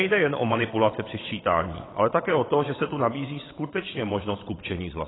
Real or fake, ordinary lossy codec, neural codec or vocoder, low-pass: fake; AAC, 16 kbps; vocoder, 22.05 kHz, 80 mel bands, WaveNeXt; 7.2 kHz